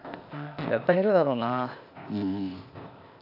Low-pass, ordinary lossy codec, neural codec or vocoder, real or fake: 5.4 kHz; none; codec, 16 kHz, 0.8 kbps, ZipCodec; fake